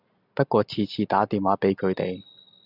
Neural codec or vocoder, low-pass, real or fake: none; 5.4 kHz; real